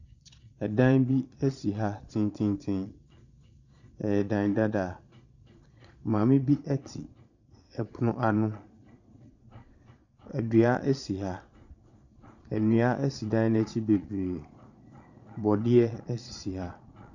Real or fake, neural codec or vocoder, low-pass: fake; vocoder, 22.05 kHz, 80 mel bands, Vocos; 7.2 kHz